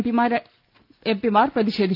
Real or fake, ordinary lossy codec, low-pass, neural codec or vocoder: real; Opus, 24 kbps; 5.4 kHz; none